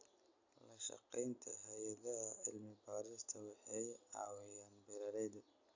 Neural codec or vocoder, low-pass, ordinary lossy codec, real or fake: none; 7.2 kHz; AAC, 48 kbps; real